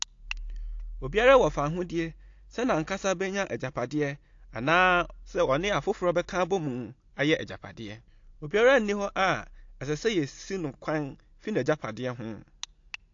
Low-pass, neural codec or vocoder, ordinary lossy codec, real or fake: 7.2 kHz; none; AAC, 48 kbps; real